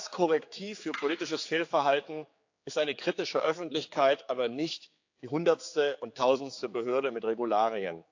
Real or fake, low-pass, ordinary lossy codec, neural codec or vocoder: fake; 7.2 kHz; AAC, 48 kbps; codec, 16 kHz, 4 kbps, X-Codec, HuBERT features, trained on general audio